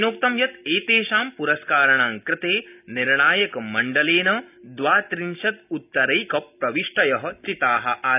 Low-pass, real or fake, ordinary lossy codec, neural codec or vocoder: 3.6 kHz; real; none; none